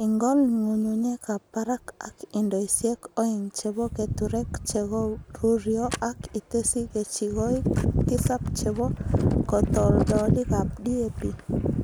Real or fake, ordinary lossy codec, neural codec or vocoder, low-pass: real; none; none; none